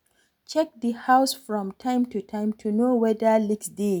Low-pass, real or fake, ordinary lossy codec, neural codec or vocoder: none; real; none; none